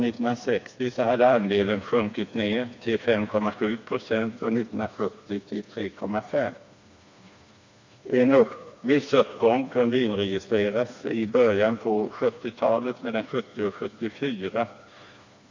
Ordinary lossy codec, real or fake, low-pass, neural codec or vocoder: MP3, 48 kbps; fake; 7.2 kHz; codec, 16 kHz, 2 kbps, FreqCodec, smaller model